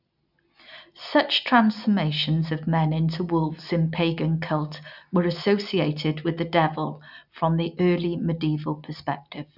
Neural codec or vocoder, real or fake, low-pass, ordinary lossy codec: none; real; 5.4 kHz; none